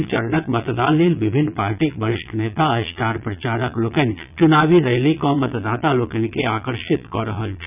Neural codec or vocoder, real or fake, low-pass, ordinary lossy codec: vocoder, 22.05 kHz, 80 mel bands, Vocos; fake; 3.6 kHz; none